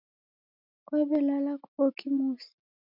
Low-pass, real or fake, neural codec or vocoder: 5.4 kHz; real; none